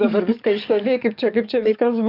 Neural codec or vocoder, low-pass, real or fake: codec, 44.1 kHz, 7.8 kbps, DAC; 5.4 kHz; fake